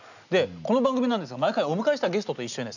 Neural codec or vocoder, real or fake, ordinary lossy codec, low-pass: none; real; none; 7.2 kHz